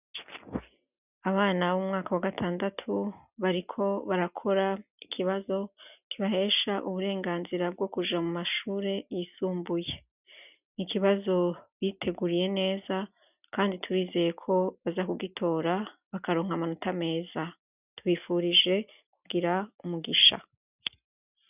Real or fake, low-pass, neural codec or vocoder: real; 3.6 kHz; none